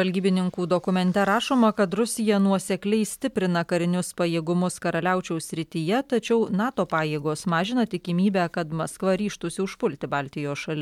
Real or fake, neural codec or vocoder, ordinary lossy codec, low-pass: real; none; MP3, 96 kbps; 19.8 kHz